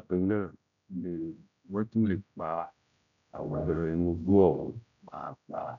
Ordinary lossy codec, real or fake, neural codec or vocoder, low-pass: none; fake; codec, 16 kHz, 0.5 kbps, X-Codec, HuBERT features, trained on general audio; 7.2 kHz